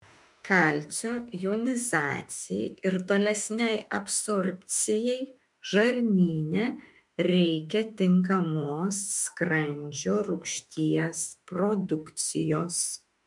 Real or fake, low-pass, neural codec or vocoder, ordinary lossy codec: fake; 10.8 kHz; autoencoder, 48 kHz, 32 numbers a frame, DAC-VAE, trained on Japanese speech; MP3, 64 kbps